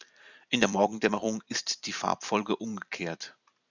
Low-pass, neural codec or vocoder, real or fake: 7.2 kHz; vocoder, 44.1 kHz, 128 mel bands every 256 samples, BigVGAN v2; fake